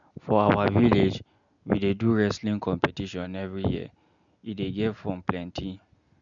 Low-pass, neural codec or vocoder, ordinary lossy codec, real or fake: 7.2 kHz; none; MP3, 96 kbps; real